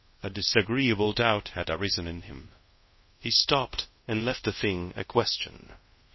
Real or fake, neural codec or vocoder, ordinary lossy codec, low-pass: fake; codec, 24 kHz, 0.5 kbps, DualCodec; MP3, 24 kbps; 7.2 kHz